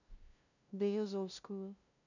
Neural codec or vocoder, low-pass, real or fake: codec, 16 kHz, 0.5 kbps, FunCodec, trained on LibriTTS, 25 frames a second; 7.2 kHz; fake